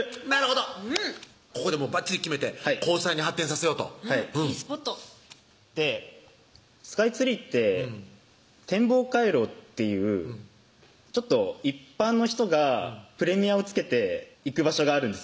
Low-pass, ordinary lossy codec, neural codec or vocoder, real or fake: none; none; none; real